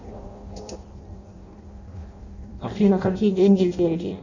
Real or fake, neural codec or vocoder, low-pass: fake; codec, 16 kHz in and 24 kHz out, 0.6 kbps, FireRedTTS-2 codec; 7.2 kHz